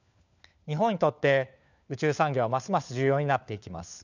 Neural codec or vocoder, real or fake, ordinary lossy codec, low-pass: codec, 16 kHz, 4 kbps, FunCodec, trained on LibriTTS, 50 frames a second; fake; none; 7.2 kHz